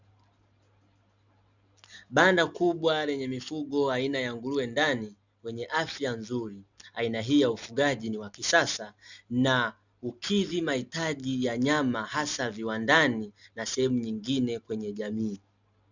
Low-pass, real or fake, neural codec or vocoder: 7.2 kHz; real; none